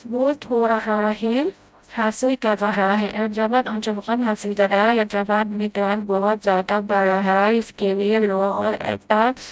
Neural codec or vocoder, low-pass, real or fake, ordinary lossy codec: codec, 16 kHz, 0.5 kbps, FreqCodec, smaller model; none; fake; none